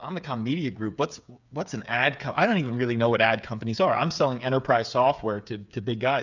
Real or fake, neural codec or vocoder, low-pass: fake; codec, 16 kHz, 8 kbps, FreqCodec, smaller model; 7.2 kHz